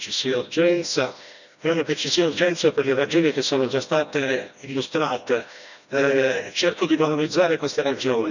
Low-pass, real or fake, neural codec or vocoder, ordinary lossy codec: 7.2 kHz; fake; codec, 16 kHz, 1 kbps, FreqCodec, smaller model; none